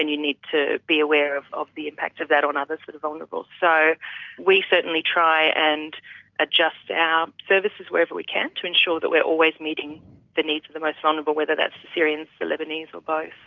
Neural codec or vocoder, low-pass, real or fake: none; 7.2 kHz; real